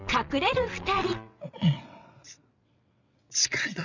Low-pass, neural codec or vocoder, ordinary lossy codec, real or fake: 7.2 kHz; vocoder, 22.05 kHz, 80 mel bands, WaveNeXt; none; fake